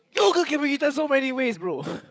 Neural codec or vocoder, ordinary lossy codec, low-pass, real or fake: codec, 16 kHz, 8 kbps, FreqCodec, larger model; none; none; fake